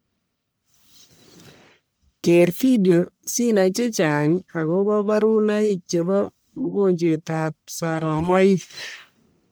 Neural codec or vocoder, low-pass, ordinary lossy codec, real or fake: codec, 44.1 kHz, 1.7 kbps, Pupu-Codec; none; none; fake